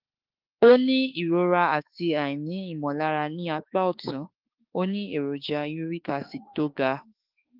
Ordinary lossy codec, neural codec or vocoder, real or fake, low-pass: Opus, 32 kbps; autoencoder, 48 kHz, 32 numbers a frame, DAC-VAE, trained on Japanese speech; fake; 5.4 kHz